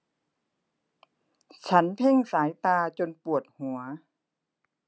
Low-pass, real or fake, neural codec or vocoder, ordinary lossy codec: none; real; none; none